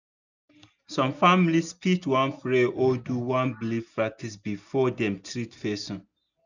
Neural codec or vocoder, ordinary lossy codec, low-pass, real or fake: none; none; 7.2 kHz; real